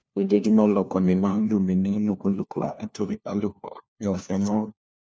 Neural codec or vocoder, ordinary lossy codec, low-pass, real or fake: codec, 16 kHz, 1 kbps, FunCodec, trained on LibriTTS, 50 frames a second; none; none; fake